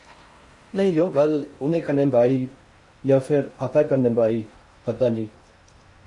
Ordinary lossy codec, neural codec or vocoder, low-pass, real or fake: MP3, 48 kbps; codec, 16 kHz in and 24 kHz out, 0.6 kbps, FocalCodec, streaming, 4096 codes; 10.8 kHz; fake